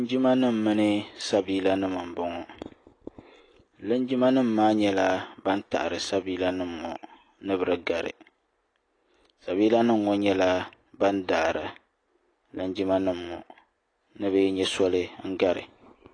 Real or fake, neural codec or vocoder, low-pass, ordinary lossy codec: real; none; 9.9 kHz; AAC, 32 kbps